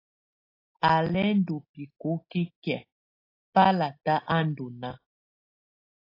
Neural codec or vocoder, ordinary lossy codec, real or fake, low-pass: none; AAC, 32 kbps; real; 5.4 kHz